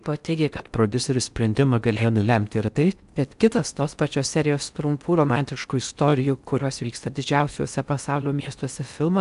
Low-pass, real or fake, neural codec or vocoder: 10.8 kHz; fake; codec, 16 kHz in and 24 kHz out, 0.6 kbps, FocalCodec, streaming, 4096 codes